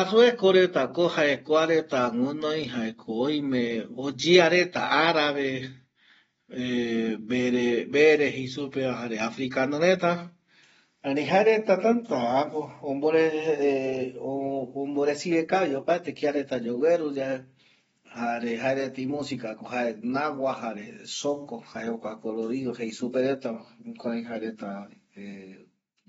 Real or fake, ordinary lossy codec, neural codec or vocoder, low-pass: real; AAC, 24 kbps; none; 19.8 kHz